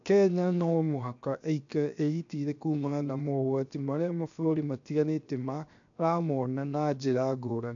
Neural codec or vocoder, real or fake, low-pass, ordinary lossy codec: codec, 16 kHz, 0.7 kbps, FocalCodec; fake; 7.2 kHz; none